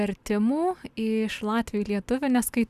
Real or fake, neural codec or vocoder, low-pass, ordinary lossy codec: real; none; 14.4 kHz; Opus, 64 kbps